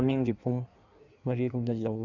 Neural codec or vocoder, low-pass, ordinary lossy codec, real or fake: codec, 16 kHz in and 24 kHz out, 1.1 kbps, FireRedTTS-2 codec; 7.2 kHz; none; fake